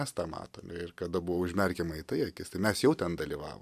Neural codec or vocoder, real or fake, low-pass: none; real; 14.4 kHz